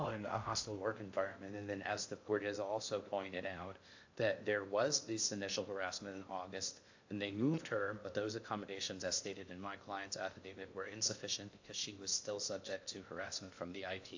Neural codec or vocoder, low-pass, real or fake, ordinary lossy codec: codec, 16 kHz in and 24 kHz out, 0.6 kbps, FocalCodec, streaming, 2048 codes; 7.2 kHz; fake; AAC, 48 kbps